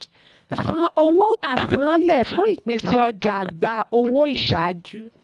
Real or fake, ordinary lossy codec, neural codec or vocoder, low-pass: fake; none; codec, 24 kHz, 1.5 kbps, HILCodec; none